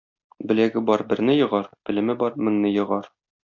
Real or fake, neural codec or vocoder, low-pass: real; none; 7.2 kHz